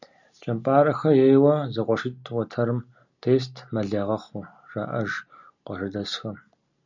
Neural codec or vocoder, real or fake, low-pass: none; real; 7.2 kHz